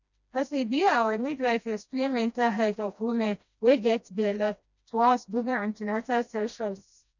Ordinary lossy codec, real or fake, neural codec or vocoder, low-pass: none; fake; codec, 16 kHz, 1 kbps, FreqCodec, smaller model; 7.2 kHz